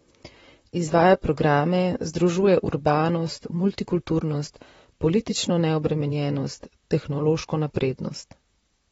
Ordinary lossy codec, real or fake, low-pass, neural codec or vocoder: AAC, 24 kbps; fake; 19.8 kHz; vocoder, 44.1 kHz, 128 mel bands, Pupu-Vocoder